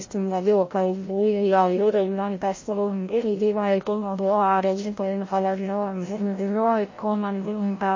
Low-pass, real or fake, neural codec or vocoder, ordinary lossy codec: 7.2 kHz; fake; codec, 16 kHz, 0.5 kbps, FreqCodec, larger model; MP3, 32 kbps